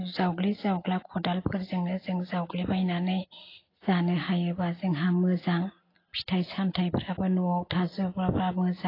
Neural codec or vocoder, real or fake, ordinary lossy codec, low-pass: none; real; AAC, 24 kbps; 5.4 kHz